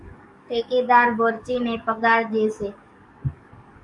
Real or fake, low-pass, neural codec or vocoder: fake; 10.8 kHz; codec, 44.1 kHz, 7.8 kbps, DAC